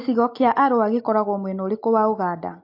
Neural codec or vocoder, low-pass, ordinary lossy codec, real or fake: none; 5.4 kHz; MP3, 32 kbps; real